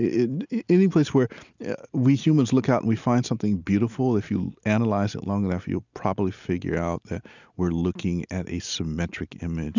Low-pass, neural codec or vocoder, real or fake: 7.2 kHz; none; real